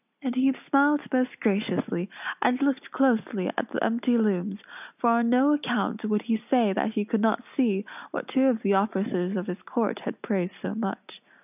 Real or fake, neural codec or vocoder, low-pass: real; none; 3.6 kHz